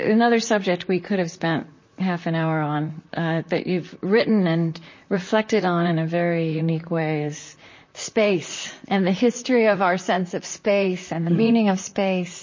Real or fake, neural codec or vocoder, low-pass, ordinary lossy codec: fake; vocoder, 44.1 kHz, 128 mel bands, Pupu-Vocoder; 7.2 kHz; MP3, 32 kbps